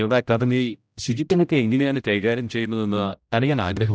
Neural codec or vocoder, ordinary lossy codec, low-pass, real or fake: codec, 16 kHz, 0.5 kbps, X-Codec, HuBERT features, trained on general audio; none; none; fake